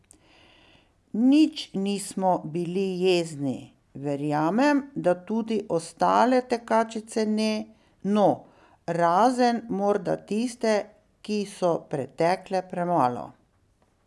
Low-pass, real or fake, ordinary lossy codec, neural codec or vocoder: none; real; none; none